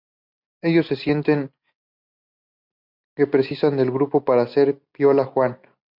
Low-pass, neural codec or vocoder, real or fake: 5.4 kHz; none; real